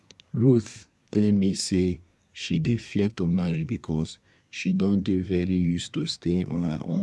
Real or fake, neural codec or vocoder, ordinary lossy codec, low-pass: fake; codec, 24 kHz, 1 kbps, SNAC; none; none